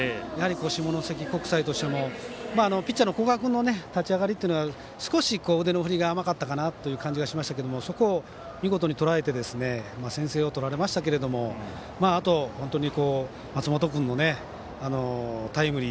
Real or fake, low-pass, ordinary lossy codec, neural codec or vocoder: real; none; none; none